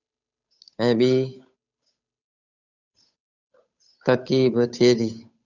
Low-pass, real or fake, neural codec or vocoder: 7.2 kHz; fake; codec, 16 kHz, 8 kbps, FunCodec, trained on Chinese and English, 25 frames a second